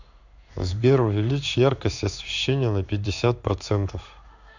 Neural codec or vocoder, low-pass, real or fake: codec, 16 kHz in and 24 kHz out, 1 kbps, XY-Tokenizer; 7.2 kHz; fake